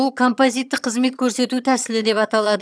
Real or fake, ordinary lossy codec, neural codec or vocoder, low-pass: fake; none; vocoder, 22.05 kHz, 80 mel bands, HiFi-GAN; none